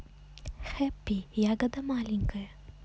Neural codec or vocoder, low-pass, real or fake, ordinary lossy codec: none; none; real; none